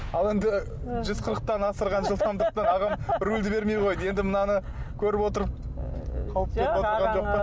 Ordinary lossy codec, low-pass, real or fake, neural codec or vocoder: none; none; real; none